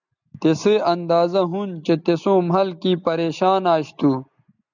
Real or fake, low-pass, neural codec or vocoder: real; 7.2 kHz; none